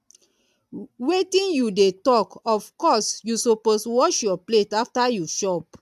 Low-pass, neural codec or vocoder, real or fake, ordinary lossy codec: 14.4 kHz; none; real; AAC, 96 kbps